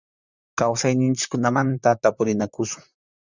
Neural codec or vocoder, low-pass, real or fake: vocoder, 44.1 kHz, 128 mel bands, Pupu-Vocoder; 7.2 kHz; fake